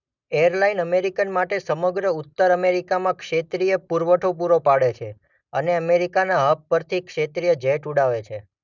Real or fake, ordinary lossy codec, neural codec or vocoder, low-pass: real; none; none; 7.2 kHz